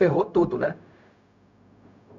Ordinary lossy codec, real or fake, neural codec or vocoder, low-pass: none; fake; codec, 16 kHz, 0.4 kbps, LongCat-Audio-Codec; 7.2 kHz